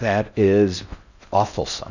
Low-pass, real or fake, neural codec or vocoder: 7.2 kHz; fake; codec, 16 kHz in and 24 kHz out, 0.6 kbps, FocalCodec, streaming, 4096 codes